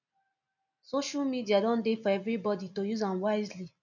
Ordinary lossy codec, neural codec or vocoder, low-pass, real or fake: none; none; 7.2 kHz; real